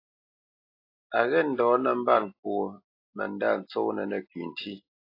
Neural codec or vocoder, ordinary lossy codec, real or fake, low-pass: none; AAC, 32 kbps; real; 5.4 kHz